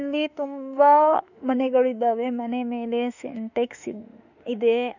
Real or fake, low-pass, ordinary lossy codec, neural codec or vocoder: fake; 7.2 kHz; none; autoencoder, 48 kHz, 32 numbers a frame, DAC-VAE, trained on Japanese speech